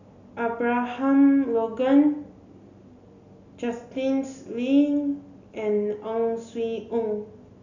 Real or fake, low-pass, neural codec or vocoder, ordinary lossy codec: real; 7.2 kHz; none; none